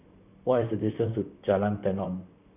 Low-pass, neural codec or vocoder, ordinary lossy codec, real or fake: 3.6 kHz; vocoder, 44.1 kHz, 128 mel bands, Pupu-Vocoder; none; fake